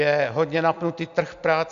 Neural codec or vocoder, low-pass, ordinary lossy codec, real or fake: none; 7.2 kHz; AAC, 64 kbps; real